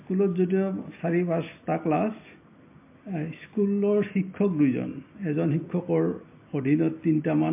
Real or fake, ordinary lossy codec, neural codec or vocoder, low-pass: real; MP3, 24 kbps; none; 3.6 kHz